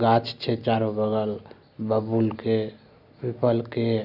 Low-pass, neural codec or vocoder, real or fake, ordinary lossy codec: 5.4 kHz; none; real; none